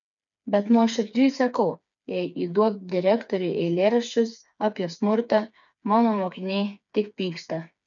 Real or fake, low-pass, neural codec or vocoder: fake; 7.2 kHz; codec, 16 kHz, 4 kbps, FreqCodec, smaller model